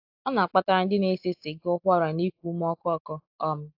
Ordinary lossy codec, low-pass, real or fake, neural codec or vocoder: AAC, 48 kbps; 5.4 kHz; real; none